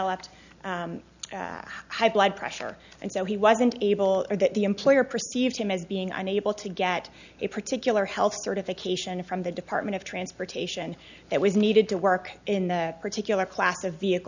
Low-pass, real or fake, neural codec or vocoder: 7.2 kHz; real; none